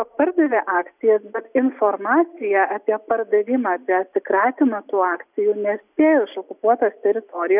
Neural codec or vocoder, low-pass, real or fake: none; 3.6 kHz; real